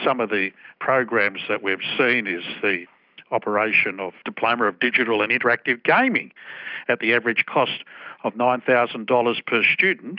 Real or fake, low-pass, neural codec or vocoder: real; 5.4 kHz; none